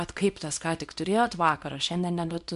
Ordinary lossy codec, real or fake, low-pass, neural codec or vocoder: MP3, 64 kbps; fake; 10.8 kHz; codec, 24 kHz, 0.9 kbps, WavTokenizer, small release